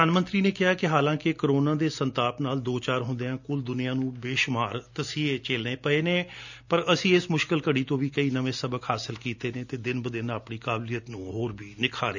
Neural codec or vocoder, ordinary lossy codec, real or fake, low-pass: none; none; real; 7.2 kHz